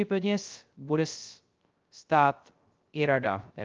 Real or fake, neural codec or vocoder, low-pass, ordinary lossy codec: fake; codec, 16 kHz, 0.3 kbps, FocalCodec; 7.2 kHz; Opus, 24 kbps